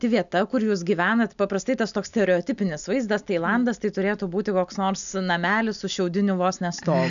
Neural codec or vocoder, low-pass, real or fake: none; 7.2 kHz; real